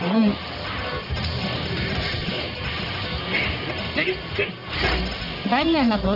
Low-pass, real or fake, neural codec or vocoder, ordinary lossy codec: 5.4 kHz; fake; codec, 44.1 kHz, 1.7 kbps, Pupu-Codec; none